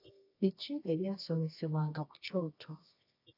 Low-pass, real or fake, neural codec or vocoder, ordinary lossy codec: 5.4 kHz; fake; codec, 24 kHz, 0.9 kbps, WavTokenizer, medium music audio release; AAC, 32 kbps